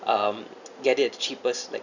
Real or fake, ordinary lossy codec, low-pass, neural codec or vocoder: real; none; 7.2 kHz; none